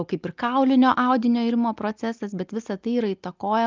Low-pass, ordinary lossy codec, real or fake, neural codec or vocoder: 7.2 kHz; Opus, 24 kbps; real; none